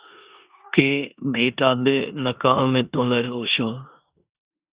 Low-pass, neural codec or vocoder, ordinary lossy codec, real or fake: 3.6 kHz; codec, 16 kHz in and 24 kHz out, 0.9 kbps, LongCat-Audio-Codec, four codebook decoder; Opus, 64 kbps; fake